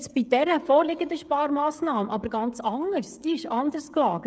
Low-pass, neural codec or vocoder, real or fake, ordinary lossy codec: none; codec, 16 kHz, 16 kbps, FreqCodec, smaller model; fake; none